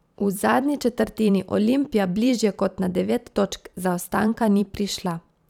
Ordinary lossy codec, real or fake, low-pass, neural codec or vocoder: none; fake; 19.8 kHz; vocoder, 44.1 kHz, 128 mel bands every 512 samples, BigVGAN v2